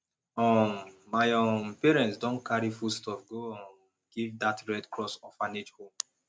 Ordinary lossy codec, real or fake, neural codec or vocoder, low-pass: none; real; none; none